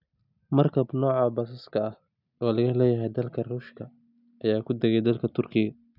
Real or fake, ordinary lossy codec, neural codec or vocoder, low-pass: real; none; none; 5.4 kHz